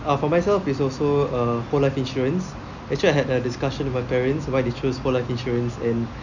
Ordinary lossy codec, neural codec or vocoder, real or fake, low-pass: none; none; real; 7.2 kHz